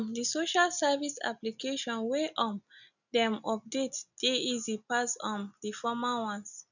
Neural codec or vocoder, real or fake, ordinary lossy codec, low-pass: none; real; none; 7.2 kHz